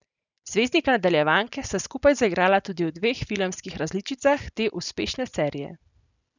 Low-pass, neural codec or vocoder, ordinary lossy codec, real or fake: 7.2 kHz; none; none; real